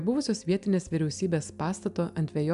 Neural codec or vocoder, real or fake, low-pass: none; real; 10.8 kHz